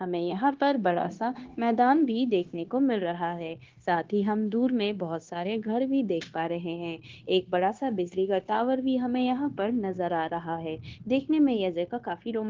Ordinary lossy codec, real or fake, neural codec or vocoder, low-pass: Opus, 16 kbps; fake; codec, 16 kHz, 0.9 kbps, LongCat-Audio-Codec; 7.2 kHz